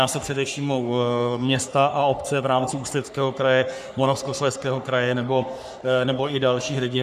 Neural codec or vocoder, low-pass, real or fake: codec, 44.1 kHz, 3.4 kbps, Pupu-Codec; 14.4 kHz; fake